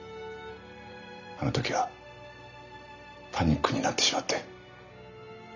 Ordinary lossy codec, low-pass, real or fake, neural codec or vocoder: none; 7.2 kHz; real; none